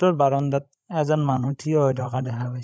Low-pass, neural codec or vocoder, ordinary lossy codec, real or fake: none; codec, 16 kHz, 8 kbps, FreqCodec, larger model; none; fake